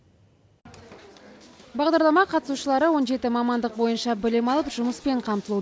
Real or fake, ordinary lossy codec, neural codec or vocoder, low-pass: real; none; none; none